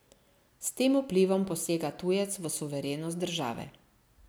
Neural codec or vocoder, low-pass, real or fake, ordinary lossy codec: none; none; real; none